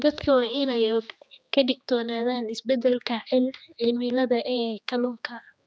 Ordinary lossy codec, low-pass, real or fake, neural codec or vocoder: none; none; fake; codec, 16 kHz, 2 kbps, X-Codec, HuBERT features, trained on general audio